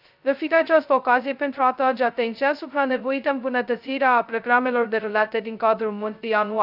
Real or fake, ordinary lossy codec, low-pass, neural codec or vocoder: fake; none; 5.4 kHz; codec, 16 kHz, 0.2 kbps, FocalCodec